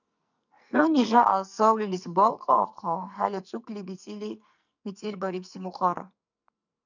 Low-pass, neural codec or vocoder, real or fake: 7.2 kHz; codec, 44.1 kHz, 2.6 kbps, SNAC; fake